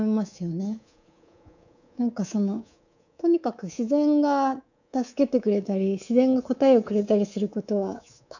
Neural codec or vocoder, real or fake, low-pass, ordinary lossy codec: codec, 24 kHz, 3.1 kbps, DualCodec; fake; 7.2 kHz; none